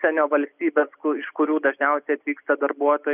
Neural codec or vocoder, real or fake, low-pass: none; real; 3.6 kHz